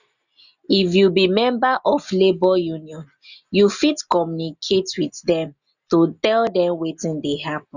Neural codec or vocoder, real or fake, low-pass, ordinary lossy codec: none; real; 7.2 kHz; none